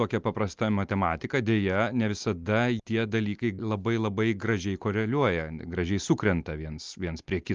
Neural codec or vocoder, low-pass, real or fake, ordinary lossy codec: none; 7.2 kHz; real; Opus, 32 kbps